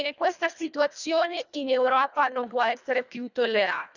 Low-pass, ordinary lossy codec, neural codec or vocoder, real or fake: 7.2 kHz; none; codec, 24 kHz, 1.5 kbps, HILCodec; fake